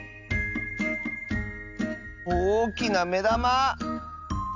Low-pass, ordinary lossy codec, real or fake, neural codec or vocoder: 7.2 kHz; none; real; none